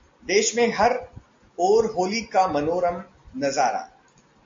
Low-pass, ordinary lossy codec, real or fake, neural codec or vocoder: 7.2 kHz; AAC, 48 kbps; real; none